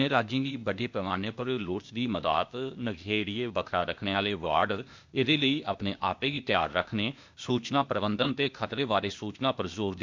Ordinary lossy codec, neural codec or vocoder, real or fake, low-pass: MP3, 64 kbps; codec, 16 kHz, 0.8 kbps, ZipCodec; fake; 7.2 kHz